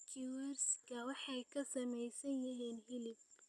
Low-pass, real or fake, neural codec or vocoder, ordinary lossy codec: none; real; none; none